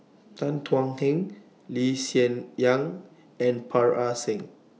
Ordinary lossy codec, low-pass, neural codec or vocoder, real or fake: none; none; none; real